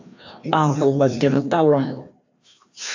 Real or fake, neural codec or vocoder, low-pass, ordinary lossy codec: fake; codec, 16 kHz, 1 kbps, FreqCodec, larger model; 7.2 kHz; AAC, 48 kbps